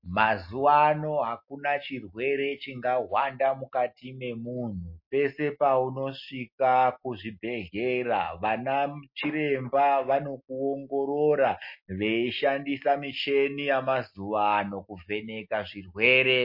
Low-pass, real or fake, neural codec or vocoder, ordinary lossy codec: 5.4 kHz; real; none; MP3, 32 kbps